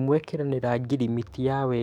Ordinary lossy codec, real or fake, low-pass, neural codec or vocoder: MP3, 96 kbps; fake; 19.8 kHz; autoencoder, 48 kHz, 128 numbers a frame, DAC-VAE, trained on Japanese speech